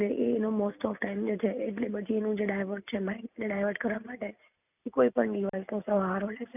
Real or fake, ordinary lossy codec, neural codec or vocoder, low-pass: real; none; none; 3.6 kHz